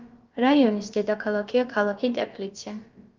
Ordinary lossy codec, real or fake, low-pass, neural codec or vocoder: Opus, 24 kbps; fake; 7.2 kHz; codec, 16 kHz, about 1 kbps, DyCAST, with the encoder's durations